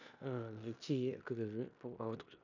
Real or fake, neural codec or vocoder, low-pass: fake; codec, 16 kHz in and 24 kHz out, 0.9 kbps, LongCat-Audio-Codec, four codebook decoder; 7.2 kHz